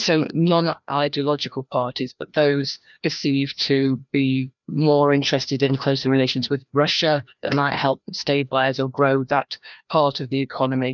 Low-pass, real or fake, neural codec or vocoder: 7.2 kHz; fake; codec, 16 kHz, 1 kbps, FreqCodec, larger model